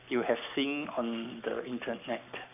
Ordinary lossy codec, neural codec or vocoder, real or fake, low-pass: none; codec, 44.1 kHz, 7.8 kbps, Pupu-Codec; fake; 3.6 kHz